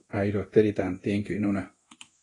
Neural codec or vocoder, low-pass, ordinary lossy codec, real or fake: codec, 24 kHz, 0.9 kbps, DualCodec; 10.8 kHz; AAC, 32 kbps; fake